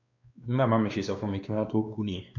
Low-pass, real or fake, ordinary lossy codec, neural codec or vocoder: 7.2 kHz; fake; none; codec, 16 kHz, 2 kbps, X-Codec, WavLM features, trained on Multilingual LibriSpeech